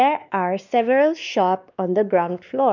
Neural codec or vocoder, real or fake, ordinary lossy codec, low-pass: codec, 16 kHz, 2 kbps, X-Codec, WavLM features, trained on Multilingual LibriSpeech; fake; none; 7.2 kHz